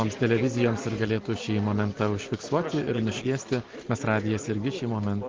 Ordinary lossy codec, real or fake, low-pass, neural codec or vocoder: Opus, 16 kbps; real; 7.2 kHz; none